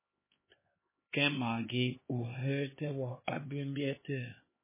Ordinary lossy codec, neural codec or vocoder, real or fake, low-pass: MP3, 16 kbps; codec, 16 kHz, 2 kbps, X-Codec, HuBERT features, trained on LibriSpeech; fake; 3.6 kHz